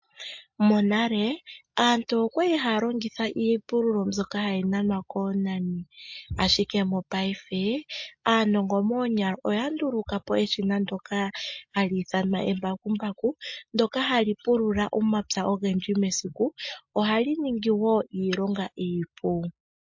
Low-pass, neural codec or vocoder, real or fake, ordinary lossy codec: 7.2 kHz; none; real; MP3, 48 kbps